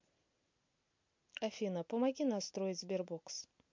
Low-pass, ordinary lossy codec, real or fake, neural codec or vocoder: 7.2 kHz; MP3, 48 kbps; real; none